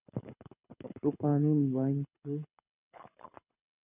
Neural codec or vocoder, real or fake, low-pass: codec, 24 kHz, 6 kbps, HILCodec; fake; 3.6 kHz